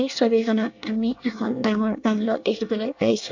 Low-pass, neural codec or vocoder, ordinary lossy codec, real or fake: 7.2 kHz; codec, 24 kHz, 1 kbps, SNAC; AAC, 48 kbps; fake